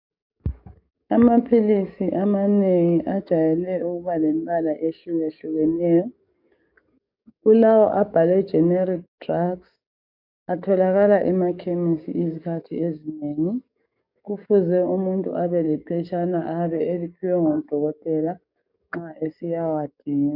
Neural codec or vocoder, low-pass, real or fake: codec, 44.1 kHz, 7.8 kbps, DAC; 5.4 kHz; fake